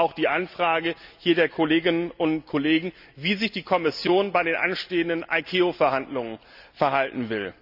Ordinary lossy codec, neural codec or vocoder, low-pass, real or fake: none; none; 5.4 kHz; real